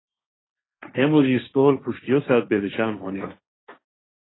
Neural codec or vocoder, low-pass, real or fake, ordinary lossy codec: codec, 16 kHz, 1.1 kbps, Voila-Tokenizer; 7.2 kHz; fake; AAC, 16 kbps